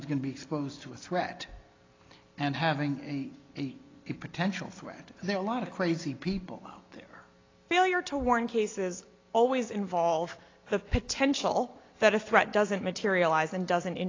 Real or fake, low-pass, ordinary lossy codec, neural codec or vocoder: real; 7.2 kHz; AAC, 32 kbps; none